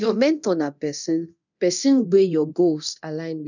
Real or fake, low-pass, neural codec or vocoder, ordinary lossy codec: fake; 7.2 kHz; codec, 24 kHz, 0.9 kbps, DualCodec; none